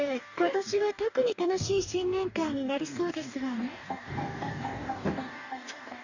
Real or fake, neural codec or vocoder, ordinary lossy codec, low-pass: fake; codec, 44.1 kHz, 2.6 kbps, DAC; none; 7.2 kHz